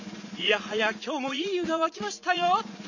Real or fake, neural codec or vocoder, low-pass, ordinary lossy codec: fake; vocoder, 44.1 kHz, 80 mel bands, Vocos; 7.2 kHz; none